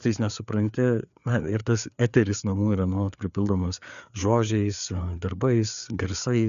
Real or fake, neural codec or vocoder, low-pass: fake; codec, 16 kHz, 4 kbps, FreqCodec, larger model; 7.2 kHz